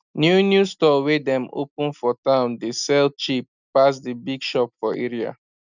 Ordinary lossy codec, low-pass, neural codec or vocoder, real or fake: none; 7.2 kHz; none; real